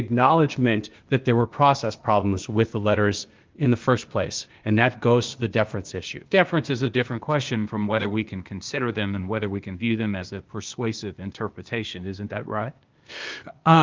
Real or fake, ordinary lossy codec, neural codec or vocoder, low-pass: fake; Opus, 24 kbps; codec, 16 kHz, 0.8 kbps, ZipCodec; 7.2 kHz